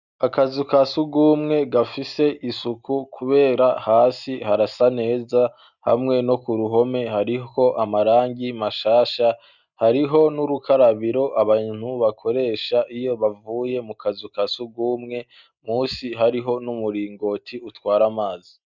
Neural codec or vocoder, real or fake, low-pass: none; real; 7.2 kHz